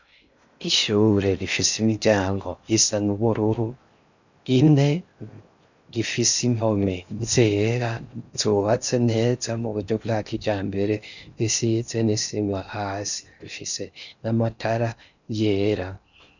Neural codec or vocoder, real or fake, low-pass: codec, 16 kHz in and 24 kHz out, 0.6 kbps, FocalCodec, streaming, 4096 codes; fake; 7.2 kHz